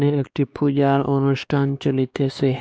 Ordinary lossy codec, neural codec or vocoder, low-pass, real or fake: none; codec, 16 kHz, 2 kbps, X-Codec, WavLM features, trained on Multilingual LibriSpeech; none; fake